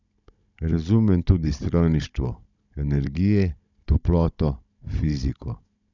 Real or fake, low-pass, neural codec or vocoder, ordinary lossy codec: fake; 7.2 kHz; codec, 16 kHz, 16 kbps, FunCodec, trained on Chinese and English, 50 frames a second; none